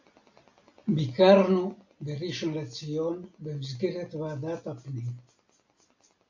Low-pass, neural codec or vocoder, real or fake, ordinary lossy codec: 7.2 kHz; none; real; AAC, 48 kbps